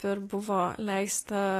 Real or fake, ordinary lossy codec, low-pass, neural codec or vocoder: real; AAC, 48 kbps; 14.4 kHz; none